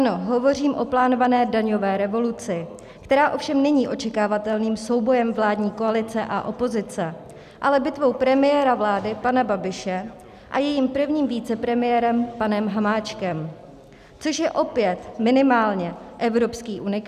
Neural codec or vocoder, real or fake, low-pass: none; real; 14.4 kHz